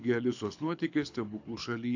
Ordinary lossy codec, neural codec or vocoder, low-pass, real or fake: AAC, 48 kbps; codec, 24 kHz, 6 kbps, HILCodec; 7.2 kHz; fake